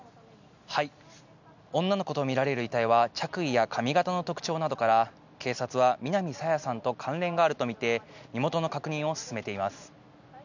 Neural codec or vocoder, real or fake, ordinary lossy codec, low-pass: none; real; none; 7.2 kHz